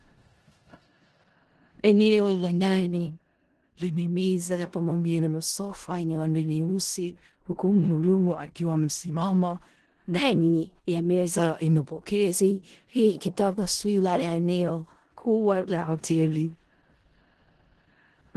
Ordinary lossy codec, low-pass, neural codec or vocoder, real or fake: Opus, 16 kbps; 10.8 kHz; codec, 16 kHz in and 24 kHz out, 0.4 kbps, LongCat-Audio-Codec, four codebook decoder; fake